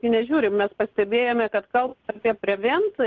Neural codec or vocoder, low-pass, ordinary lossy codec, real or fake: none; 7.2 kHz; Opus, 16 kbps; real